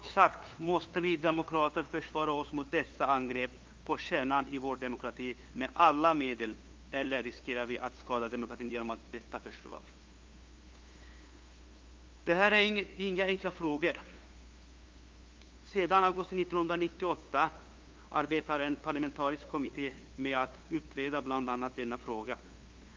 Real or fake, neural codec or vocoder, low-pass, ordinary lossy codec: fake; codec, 16 kHz, 2 kbps, FunCodec, trained on LibriTTS, 25 frames a second; 7.2 kHz; Opus, 32 kbps